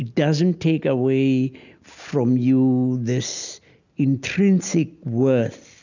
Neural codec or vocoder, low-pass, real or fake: none; 7.2 kHz; real